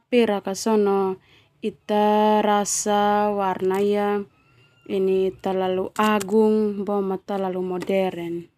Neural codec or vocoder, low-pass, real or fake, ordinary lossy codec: none; 14.4 kHz; real; none